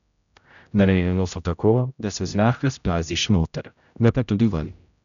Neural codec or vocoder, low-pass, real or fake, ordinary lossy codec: codec, 16 kHz, 0.5 kbps, X-Codec, HuBERT features, trained on general audio; 7.2 kHz; fake; none